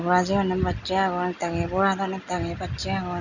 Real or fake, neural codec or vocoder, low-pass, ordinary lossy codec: real; none; 7.2 kHz; none